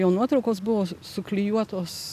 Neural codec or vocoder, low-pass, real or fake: none; 14.4 kHz; real